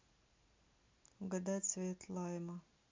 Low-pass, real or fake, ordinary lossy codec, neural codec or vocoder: 7.2 kHz; real; MP3, 48 kbps; none